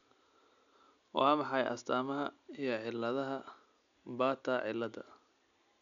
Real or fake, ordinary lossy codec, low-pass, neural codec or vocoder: real; none; 7.2 kHz; none